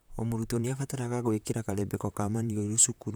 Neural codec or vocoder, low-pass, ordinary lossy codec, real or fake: vocoder, 44.1 kHz, 128 mel bands, Pupu-Vocoder; none; none; fake